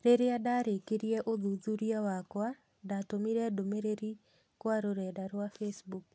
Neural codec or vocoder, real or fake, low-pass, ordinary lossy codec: none; real; none; none